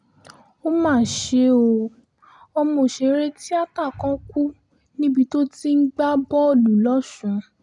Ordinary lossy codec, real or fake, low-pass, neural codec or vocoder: none; real; 10.8 kHz; none